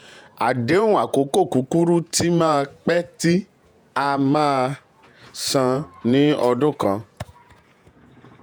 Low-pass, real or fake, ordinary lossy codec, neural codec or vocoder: none; fake; none; vocoder, 48 kHz, 128 mel bands, Vocos